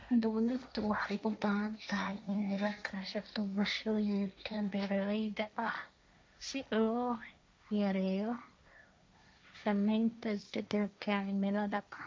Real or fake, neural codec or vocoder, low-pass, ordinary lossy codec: fake; codec, 16 kHz, 1.1 kbps, Voila-Tokenizer; none; none